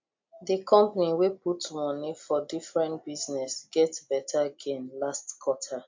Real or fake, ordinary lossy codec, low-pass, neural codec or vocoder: real; MP3, 48 kbps; 7.2 kHz; none